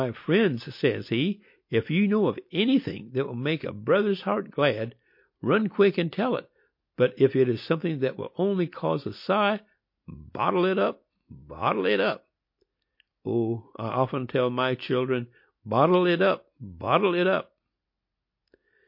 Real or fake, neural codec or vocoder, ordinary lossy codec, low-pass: real; none; MP3, 32 kbps; 5.4 kHz